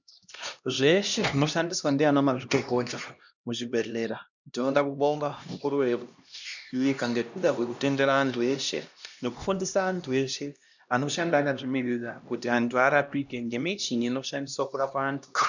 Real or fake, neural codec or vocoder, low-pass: fake; codec, 16 kHz, 1 kbps, X-Codec, HuBERT features, trained on LibriSpeech; 7.2 kHz